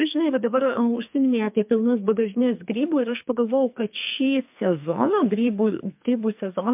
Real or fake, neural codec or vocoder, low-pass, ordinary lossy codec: fake; codec, 44.1 kHz, 2.6 kbps, SNAC; 3.6 kHz; MP3, 32 kbps